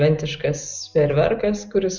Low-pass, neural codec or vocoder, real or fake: 7.2 kHz; none; real